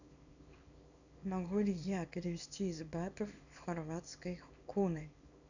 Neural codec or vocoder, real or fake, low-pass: codec, 24 kHz, 0.9 kbps, WavTokenizer, small release; fake; 7.2 kHz